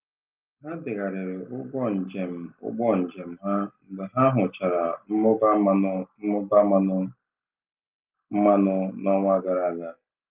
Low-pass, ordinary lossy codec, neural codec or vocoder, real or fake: 3.6 kHz; Opus, 24 kbps; none; real